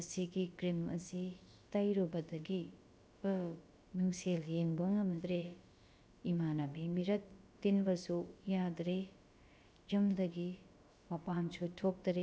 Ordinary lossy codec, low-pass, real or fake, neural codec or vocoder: none; none; fake; codec, 16 kHz, about 1 kbps, DyCAST, with the encoder's durations